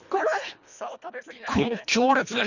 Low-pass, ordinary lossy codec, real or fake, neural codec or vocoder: 7.2 kHz; none; fake; codec, 24 kHz, 1.5 kbps, HILCodec